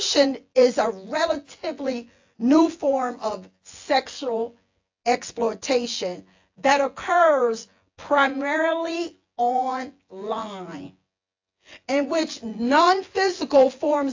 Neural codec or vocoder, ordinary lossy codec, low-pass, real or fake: vocoder, 24 kHz, 100 mel bands, Vocos; AAC, 48 kbps; 7.2 kHz; fake